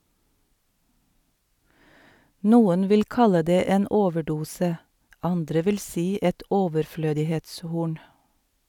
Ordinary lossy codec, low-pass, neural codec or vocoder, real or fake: none; 19.8 kHz; none; real